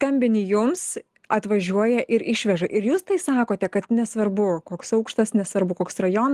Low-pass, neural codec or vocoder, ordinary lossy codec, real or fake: 14.4 kHz; none; Opus, 24 kbps; real